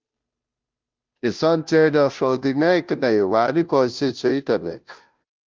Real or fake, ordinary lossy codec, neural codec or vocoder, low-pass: fake; Opus, 24 kbps; codec, 16 kHz, 0.5 kbps, FunCodec, trained on Chinese and English, 25 frames a second; 7.2 kHz